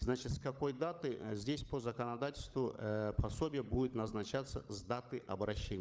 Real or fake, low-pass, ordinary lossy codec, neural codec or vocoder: fake; none; none; codec, 16 kHz, 16 kbps, FunCodec, trained on LibriTTS, 50 frames a second